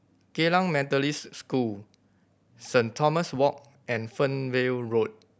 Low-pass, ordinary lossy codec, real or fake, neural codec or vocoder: none; none; real; none